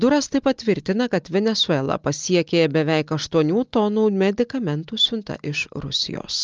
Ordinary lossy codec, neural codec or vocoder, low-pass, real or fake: Opus, 24 kbps; none; 7.2 kHz; real